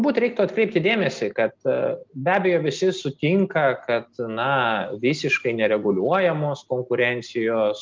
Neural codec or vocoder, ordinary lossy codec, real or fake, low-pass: none; Opus, 24 kbps; real; 7.2 kHz